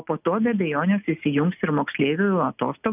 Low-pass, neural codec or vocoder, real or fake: 3.6 kHz; none; real